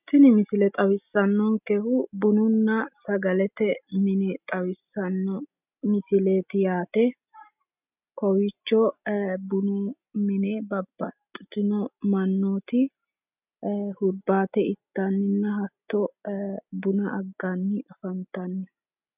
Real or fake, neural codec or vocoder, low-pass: real; none; 3.6 kHz